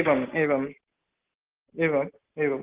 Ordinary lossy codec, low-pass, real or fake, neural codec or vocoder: Opus, 16 kbps; 3.6 kHz; real; none